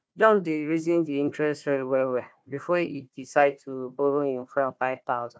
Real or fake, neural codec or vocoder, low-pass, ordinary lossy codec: fake; codec, 16 kHz, 1 kbps, FunCodec, trained on Chinese and English, 50 frames a second; none; none